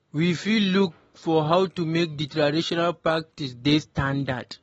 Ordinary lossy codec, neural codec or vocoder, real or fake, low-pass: AAC, 24 kbps; none; real; 19.8 kHz